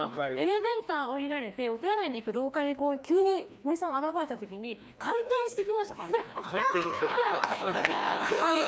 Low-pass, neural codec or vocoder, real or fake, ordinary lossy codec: none; codec, 16 kHz, 1 kbps, FreqCodec, larger model; fake; none